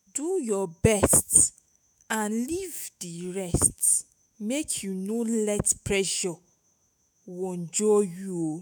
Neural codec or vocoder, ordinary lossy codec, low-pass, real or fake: autoencoder, 48 kHz, 128 numbers a frame, DAC-VAE, trained on Japanese speech; none; none; fake